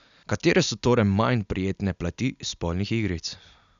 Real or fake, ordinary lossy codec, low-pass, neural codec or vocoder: real; none; 7.2 kHz; none